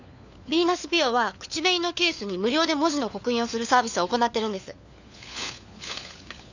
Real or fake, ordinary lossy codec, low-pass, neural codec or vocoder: fake; none; 7.2 kHz; codec, 16 kHz, 4 kbps, FunCodec, trained on LibriTTS, 50 frames a second